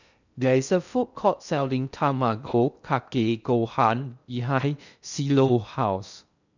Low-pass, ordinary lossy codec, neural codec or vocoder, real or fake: 7.2 kHz; none; codec, 16 kHz in and 24 kHz out, 0.6 kbps, FocalCodec, streaming, 2048 codes; fake